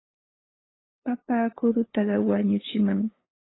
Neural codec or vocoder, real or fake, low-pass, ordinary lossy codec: codec, 16 kHz, 8 kbps, FunCodec, trained on LibriTTS, 25 frames a second; fake; 7.2 kHz; AAC, 16 kbps